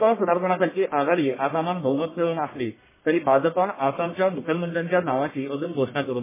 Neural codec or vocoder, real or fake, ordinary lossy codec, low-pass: codec, 44.1 kHz, 1.7 kbps, Pupu-Codec; fake; MP3, 16 kbps; 3.6 kHz